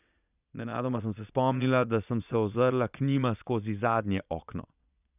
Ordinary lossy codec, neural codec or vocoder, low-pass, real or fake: none; vocoder, 22.05 kHz, 80 mel bands, Vocos; 3.6 kHz; fake